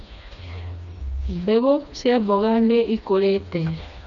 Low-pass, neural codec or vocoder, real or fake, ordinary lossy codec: 7.2 kHz; codec, 16 kHz, 2 kbps, FreqCodec, smaller model; fake; none